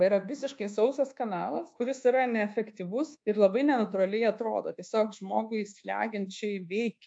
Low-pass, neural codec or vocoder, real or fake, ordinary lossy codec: 9.9 kHz; codec, 24 kHz, 1.2 kbps, DualCodec; fake; AAC, 64 kbps